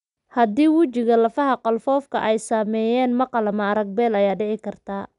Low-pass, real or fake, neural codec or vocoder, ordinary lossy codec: 10.8 kHz; real; none; none